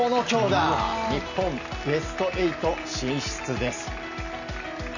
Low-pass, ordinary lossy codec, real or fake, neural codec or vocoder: 7.2 kHz; none; real; none